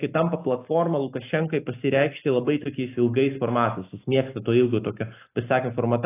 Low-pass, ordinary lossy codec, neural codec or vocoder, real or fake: 3.6 kHz; AAC, 24 kbps; none; real